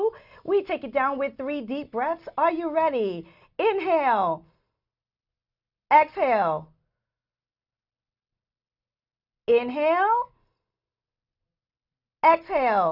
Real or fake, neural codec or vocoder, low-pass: real; none; 5.4 kHz